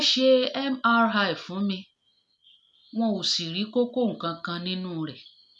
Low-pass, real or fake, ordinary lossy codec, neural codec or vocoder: none; real; none; none